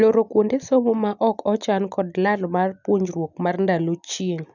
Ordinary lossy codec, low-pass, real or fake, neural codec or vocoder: none; 7.2 kHz; real; none